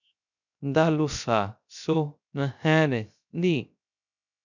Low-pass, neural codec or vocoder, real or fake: 7.2 kHz; codec, 16 kHz, 0.3 kbps, FocalCodec; fake